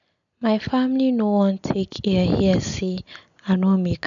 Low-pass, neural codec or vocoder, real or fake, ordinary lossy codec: 7.2 kHz; none; real; none